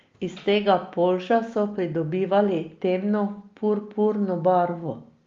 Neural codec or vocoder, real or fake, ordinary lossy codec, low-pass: none; real; Opus, 32 kbps; 7.2 kHz